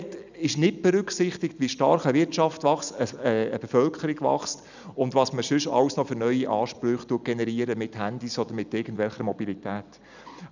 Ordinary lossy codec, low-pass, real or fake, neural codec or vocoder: none; 7.2 kHz; real; none